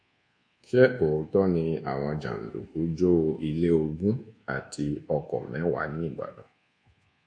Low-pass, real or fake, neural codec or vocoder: 9.9 kHz; fake; codec, 24 kHz, 1.2 kbps, DualCodec